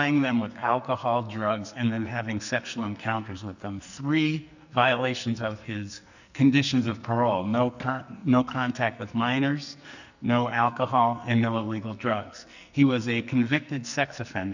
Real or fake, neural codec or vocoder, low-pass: fake; codec, 44.1 kHz, 2.6 kbps, SNAC; 7.2 kHz